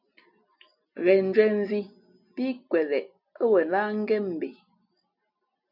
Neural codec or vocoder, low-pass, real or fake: none; 5.4 kHz; real